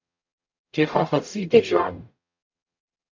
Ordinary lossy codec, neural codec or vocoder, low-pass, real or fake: AAC, 48 kbps; codec, 44.1 kHz, 0.9 kbps, DAC; 7.2 kHz; fake